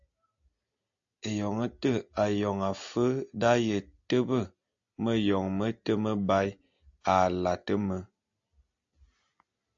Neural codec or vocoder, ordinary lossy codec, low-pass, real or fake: none; AAC, 64 kbps; 7.2 kHz; real